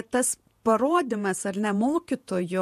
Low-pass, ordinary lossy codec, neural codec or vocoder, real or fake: 14.4 kHz; MP3, 64 kbps; vocoder, 48 kHz, 128 mel bands, Vocos; fake